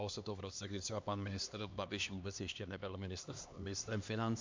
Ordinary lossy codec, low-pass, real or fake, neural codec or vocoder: MP3, 64 kbps; 7.2 kHz; fake; codec, 16 kHz, 2 kbps, X-Codec, HuBERT features, trained on LibriSpeech